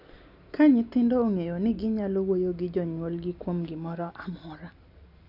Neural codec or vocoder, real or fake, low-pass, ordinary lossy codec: none; real; 5.4 kHz; none